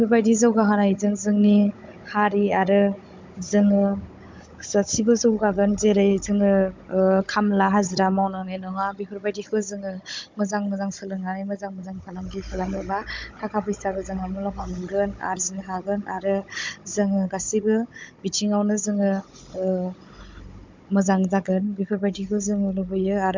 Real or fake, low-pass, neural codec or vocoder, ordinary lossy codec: fake; 7.2 kHz; codec, 16 kHz, 16 kbps, FunCodec, trained on Chinese and English, 50 frames a second; MP3, 64 kbps